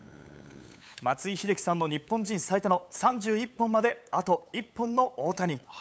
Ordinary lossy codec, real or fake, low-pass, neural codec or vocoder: none; fake; none; codec, 16 kHz, 8 kbps, FunCodec, trained on LibriTTS, 25 frames a second